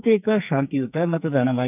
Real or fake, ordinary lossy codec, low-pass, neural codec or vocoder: fake; none; 3.6 kHz; codec, 44.1 kHz, 2.6 kbps, SNAC